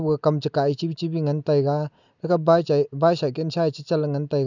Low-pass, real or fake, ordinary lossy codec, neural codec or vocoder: 7.2 kHz; real; none; none